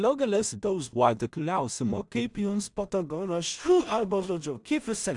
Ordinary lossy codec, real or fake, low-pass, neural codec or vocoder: MP3, 96 kbps; fake; 10.8 kHz; codec, 16 kHz in and 24 kHz out, 0.4 kbps, LongCat-Audio-Codec, two codebook decoder